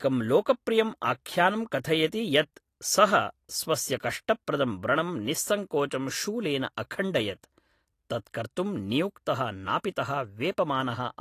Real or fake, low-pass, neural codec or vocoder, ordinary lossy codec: real; 14.4 kHz; none; AAC, 48 kbps